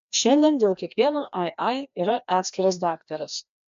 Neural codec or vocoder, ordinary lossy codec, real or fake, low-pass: codec, 16 kHz, 1 kbps, FreqCodec, larger model; MP3, 64 kbps; fake; 7.2 kHz